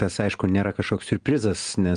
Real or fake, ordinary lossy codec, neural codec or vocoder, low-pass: real; Opus, 24 kbps; none; 9.9 kHz